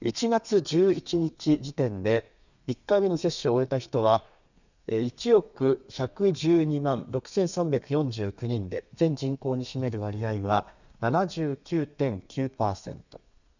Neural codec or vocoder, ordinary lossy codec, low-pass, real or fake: codec, 32 kHz, 1.9 kbps, SNAC; none; 7.2 kHz; fake